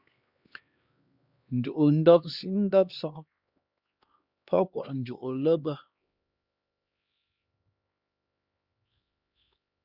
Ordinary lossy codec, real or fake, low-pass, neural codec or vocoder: Opus, 64 kbps; fake; 5.4 kHz; codec, 16 kHz, 2 kbps, X-Codec, HuBERT features, trained on LibriSpeech